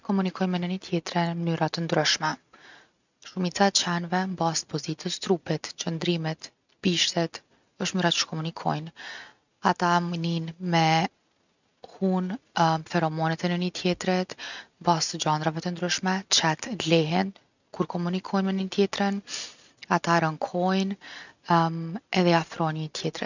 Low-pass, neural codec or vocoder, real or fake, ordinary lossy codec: 7.2 kHz; none; real; none